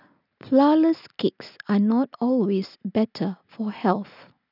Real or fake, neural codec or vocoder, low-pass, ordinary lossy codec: real; none; 5.4 kHz; none